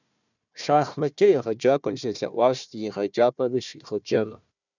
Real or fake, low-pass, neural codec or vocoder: fake; 7.2 kHz; codec, 16 kHz, 1 kbps, FunCodec, trained on Chinese and English, 50 frames a second